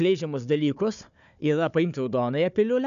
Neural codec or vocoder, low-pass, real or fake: codec, 16 kHz, 4 kbps, FunCodec, trained on Chinese and English, 50 frames a second; 7.2 kHz; fake